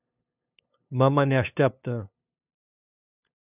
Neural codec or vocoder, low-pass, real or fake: codec, 16 kHz, 2 kbps, FunCodec, trained on LibriTTS, 25 frames a second; 3.6 kHz; fake